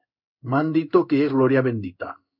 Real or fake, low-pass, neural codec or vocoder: fake; 5.4 kHz; codec, 16 kHz in and 24 kHz out, 1 kbps, XY-Tokenizer